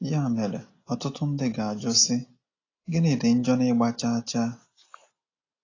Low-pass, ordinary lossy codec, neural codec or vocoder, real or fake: 7.2 kHz; AAC, 32 kbps; none; real